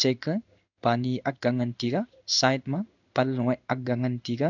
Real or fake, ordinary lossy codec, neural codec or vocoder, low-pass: fake; none; codec, 16 kHz in and 24 kHz out, 1 kbps, XY-Tokenizer; 7.2 kHz